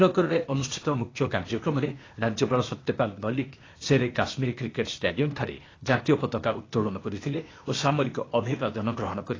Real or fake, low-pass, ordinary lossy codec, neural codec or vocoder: fake; 7.2 kHz; AAC, 32 kbps; codec, 16 kHz, 0.8 kbps, ZipCodec